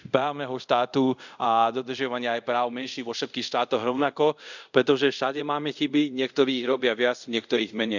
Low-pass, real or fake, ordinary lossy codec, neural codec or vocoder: 7.2 kHz; fake; none; codec, 24 kHz, 0.5 kbps, DualCodec